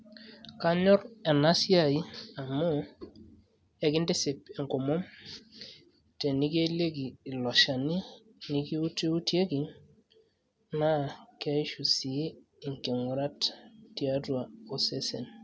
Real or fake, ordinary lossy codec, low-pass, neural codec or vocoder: real; none; none; none